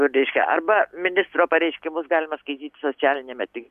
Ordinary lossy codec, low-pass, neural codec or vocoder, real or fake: AAC, 96 kbps; 14.4 kHz; none; real